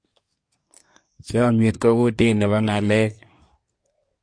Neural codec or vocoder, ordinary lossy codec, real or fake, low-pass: codec, 24 kHz, 1 kbps, SNAC; MP3, 48 kbps; fake; 9.9 kHz